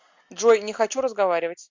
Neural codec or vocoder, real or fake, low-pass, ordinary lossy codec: none; real; 7.2 kHz; MP3, 64 kbps